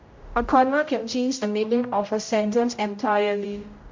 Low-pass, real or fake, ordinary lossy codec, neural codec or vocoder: 7.2 kHz; fake; MP3, 48 kbps; codec, 16 kHz, 0.5 kbps, X-Codec, HuBERT features, trained on general audio